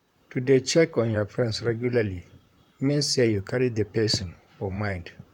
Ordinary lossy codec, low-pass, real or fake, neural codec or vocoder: none; 19.8 kHz; fake; vocoder, 44.1 kHz, 128 mel bands, Pupu-Vocoder